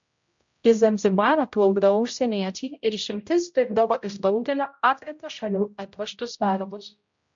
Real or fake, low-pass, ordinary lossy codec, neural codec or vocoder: fake; 7.2 kHz; MP3, 48 kbps; codec, 16 kHz, 0.5 kbps, X-Codec, HuBERT features, trained on general audio